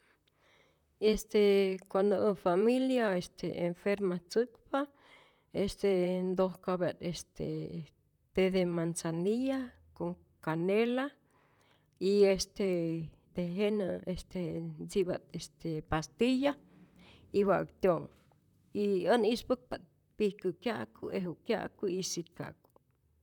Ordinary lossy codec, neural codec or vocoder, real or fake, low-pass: none; vocoder, 44.1 kHz, 128 mel bands, Pupu-Vocoder; fake; 19.8 kHz